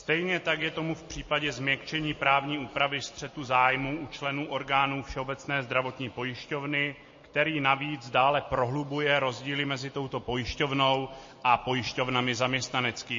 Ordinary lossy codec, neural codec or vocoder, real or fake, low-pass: MP3, 32 kbps; none; real; 7.2 kHz